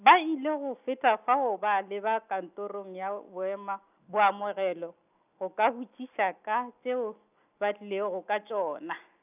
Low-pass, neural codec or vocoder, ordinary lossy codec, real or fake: 3.6 kHz; none; none; real